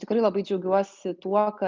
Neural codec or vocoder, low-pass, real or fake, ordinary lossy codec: none; 7.2 kHz; real; Opus, 32 kbps